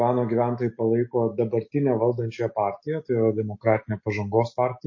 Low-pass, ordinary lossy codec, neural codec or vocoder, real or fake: 7.2 kHz; MP3, 32 kbps; none; real